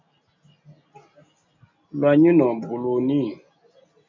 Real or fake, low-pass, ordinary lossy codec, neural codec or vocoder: real; 7.2 kHz; MP3, 64 kbps; none